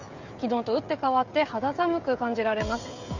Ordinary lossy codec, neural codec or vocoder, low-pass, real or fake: Opus, 64 kbps; codec, 16 kHz, 16 kbps, FreqCodec, smaller model; 7.2 kHz; fake